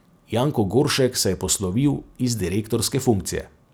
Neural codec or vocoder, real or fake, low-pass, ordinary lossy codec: none; real; none; none